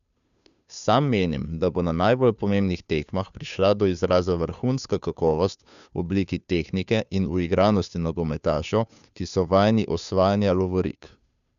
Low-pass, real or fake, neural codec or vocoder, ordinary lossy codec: 7.2 kHz; fake; codec, 16 kHz, 2 kbps, FunCodec, trained on Chinese and English, 25 frames a second; none